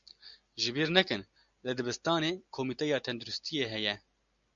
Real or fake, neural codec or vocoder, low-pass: real; none; 7.2 kHz